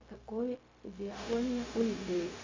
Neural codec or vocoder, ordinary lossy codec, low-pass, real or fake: codec, 16 kHz, 0.4 kbps, LongCat-Audio-Codec; MP3, 64 kbps; 7.2 kHz; fake